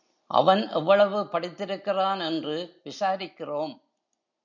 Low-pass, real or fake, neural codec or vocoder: 7.2 kHz; real; none